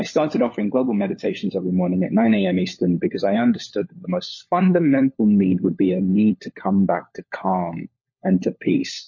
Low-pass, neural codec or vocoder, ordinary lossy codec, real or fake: 7.2 kHz; codec, 16 kHz, 4 kbps, FunCodec, trained on LibriTTS, 50 frames a second; MP3, 32 kbps; fake